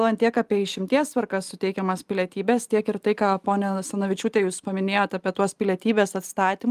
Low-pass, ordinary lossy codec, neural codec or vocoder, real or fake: 14.4 kHz; Opus, 32 kbps; none; real